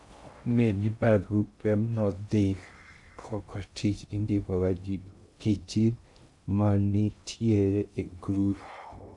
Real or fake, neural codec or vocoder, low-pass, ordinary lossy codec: fake; codec, 16 kHz in and 24 kHz out, 0.6 kbps, FocalCodec, streaming, 2048 codes; 10.8 kHz; MP3, 96 kbps